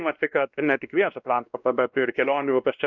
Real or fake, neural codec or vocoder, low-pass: fake; codec, 16 kHz, 1 kbps, X-Codec, WavLM features, trained on Multilingual LibriSpeech; 7.2 kHz